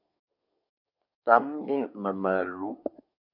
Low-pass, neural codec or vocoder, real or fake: 5.4 kHz; codec, 24 kHz, 1 kbps, SNAC; fake